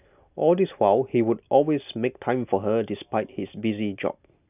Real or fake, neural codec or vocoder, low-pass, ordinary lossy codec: real; none; 3.6 kHz; none